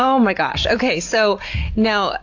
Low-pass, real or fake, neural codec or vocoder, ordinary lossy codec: 7.2 kHz; real; none; AAC, 48 kbps